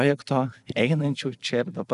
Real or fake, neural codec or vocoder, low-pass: real; none; 10.8 kHz